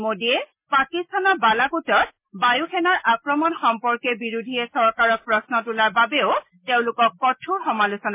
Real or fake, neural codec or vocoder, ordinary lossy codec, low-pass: real; none; MP3, 24 kbps; 3.6 kHz